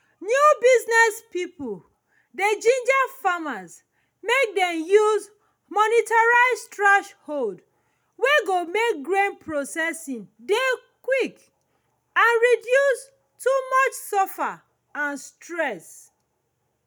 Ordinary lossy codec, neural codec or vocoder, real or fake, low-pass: none; none; real; none